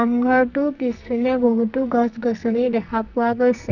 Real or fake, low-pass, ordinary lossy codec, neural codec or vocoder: fake; 7.2 kHz; none; codec, 44.1 kHz, 2.6 kbps, SNAC